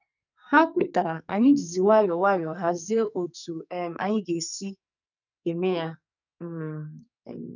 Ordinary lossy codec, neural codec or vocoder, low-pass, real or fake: none; codec, 44.1 kHz, 2.6 kbps, SNAC; 7.2 kHz; fake